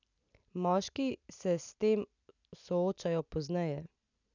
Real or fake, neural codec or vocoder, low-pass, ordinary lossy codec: real; none; 7.2 kHz; none